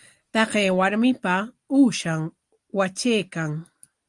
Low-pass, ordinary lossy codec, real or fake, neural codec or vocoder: 10.8 kHz; Opus, 32 kbps; real; none